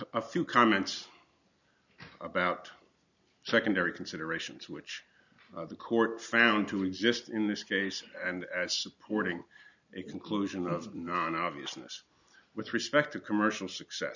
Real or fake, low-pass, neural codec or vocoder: real; 7.2 kHz; none